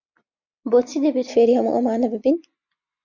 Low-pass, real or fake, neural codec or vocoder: 7.2 kHz; real; none